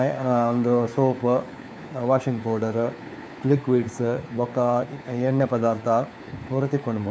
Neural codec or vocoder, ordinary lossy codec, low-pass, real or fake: codec, 16 kHz, 4 kbps, FunCodec, trained on LibriTTS, 50 frames a second; none; none; fake